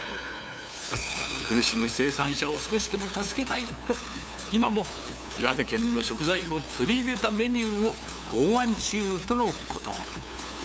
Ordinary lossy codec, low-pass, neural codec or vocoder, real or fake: none; none; codec, 16 kHz, 2 kbps, FunCodec, trained on LibriTTS, 25 frames a second; fake